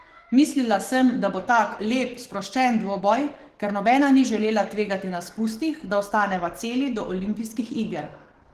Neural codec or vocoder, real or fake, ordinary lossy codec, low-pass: codec, 44.1 kHz, 7.8 kbps, DAC; fake; Opus, 16 kbps; 14.4 kHz